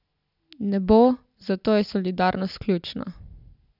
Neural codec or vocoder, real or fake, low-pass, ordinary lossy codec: none; real; 5.4 kHz; none